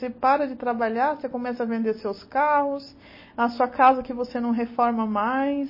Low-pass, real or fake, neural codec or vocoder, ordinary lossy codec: 5.4 kHz; real; none; MP3, 24 kbps